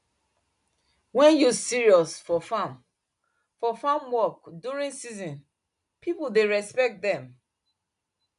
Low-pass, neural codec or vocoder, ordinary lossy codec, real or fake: 10.8 kHz; none; none; real